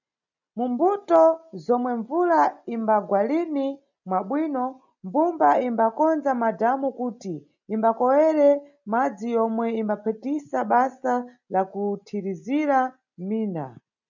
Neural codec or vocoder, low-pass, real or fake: none; 7.2 kHz; real